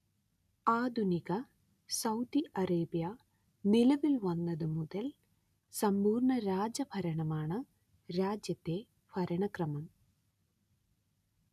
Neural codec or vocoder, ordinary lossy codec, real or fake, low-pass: none; none; real; 14.4 kHz